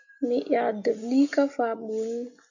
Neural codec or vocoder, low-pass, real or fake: none; 7.2 kHz; real